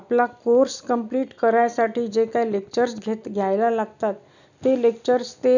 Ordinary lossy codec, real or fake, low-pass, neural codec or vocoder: none; real; 7.2 kHz; none